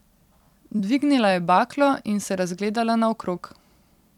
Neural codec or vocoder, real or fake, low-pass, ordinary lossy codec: vocoder, 44.1 kHz, 128 mel bands every 256 samples, BigVGAN v2; fake; 19.8 kHz; none